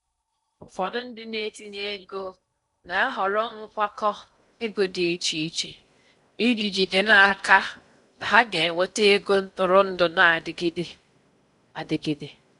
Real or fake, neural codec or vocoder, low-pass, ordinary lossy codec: fake; codec, 16 kHz in and 24 kHz out, 0.6 kbps, FocalCodec, streaming, 4096 codes; 10.8 kHz; none